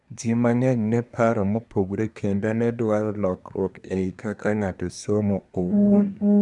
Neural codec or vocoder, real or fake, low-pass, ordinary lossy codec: codec, 24 kHz, 1 kbps, SNAC; fake; 10.8 kHz; none